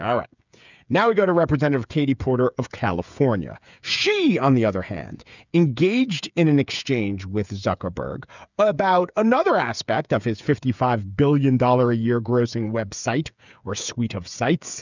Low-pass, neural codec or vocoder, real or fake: 7.2 kHz; codec, 16 kHz, 8 kbps, FreqCodec, smaller model; fake